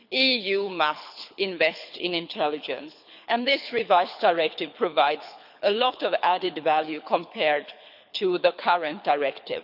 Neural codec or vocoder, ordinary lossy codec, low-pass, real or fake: codec, 24 kHz, 6 kbps, HILCodec; none; 5.4 kHz; fake